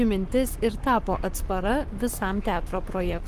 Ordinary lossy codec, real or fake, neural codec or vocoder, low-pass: Opus, 24 kbps; fake; codec, 44.1 kHz, 7.8 kbps, Pupu-Codec; 14.4 kHz